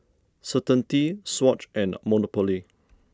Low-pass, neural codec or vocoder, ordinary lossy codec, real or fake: none; none; none; real